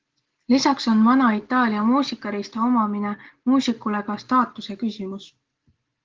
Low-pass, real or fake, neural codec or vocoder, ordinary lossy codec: 7.2 kHz; real; none; Opus, 16 kbps